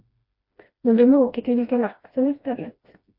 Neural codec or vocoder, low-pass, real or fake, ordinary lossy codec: codec, 16 kHz, 1 kbps, FreqCodec, smaller model; 5.4 kHz; fake; MP3, 32 kbps